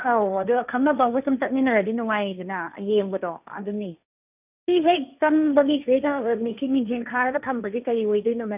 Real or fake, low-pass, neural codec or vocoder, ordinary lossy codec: fake; 3.6 kHz; codec, 16 kHz, 1.1 kbps, Voila-Tokenizer; none